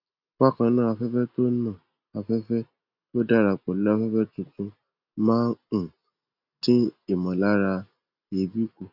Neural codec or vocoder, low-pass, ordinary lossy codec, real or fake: none; 5.4 kHz; none; real